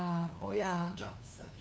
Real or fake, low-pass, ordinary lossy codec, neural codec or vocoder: fake; none; none; codec, 16 kHz, 2 kbps, FunCodec, trained on LibriTTS, 25 frames a second